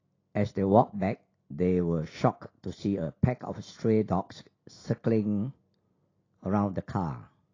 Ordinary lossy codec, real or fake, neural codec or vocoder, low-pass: AAC, 32 kbps; real; none; 7.2 kHz